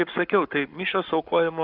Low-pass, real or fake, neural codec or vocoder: 5.4 kHz; fake; codec, 44.1 kHz, 7.8 kbps, Pupu-Codec